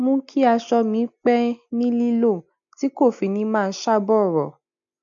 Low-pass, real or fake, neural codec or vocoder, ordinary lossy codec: 7.2 kHz; real; none; none